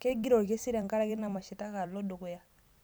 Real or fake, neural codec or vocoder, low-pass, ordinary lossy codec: fake; vocoder, 44.1 kHz, 128 mel bands every 256 samples, BigVGAN v2; none; none